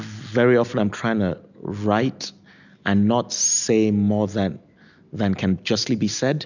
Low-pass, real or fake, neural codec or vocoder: 7.2 kHz; real; none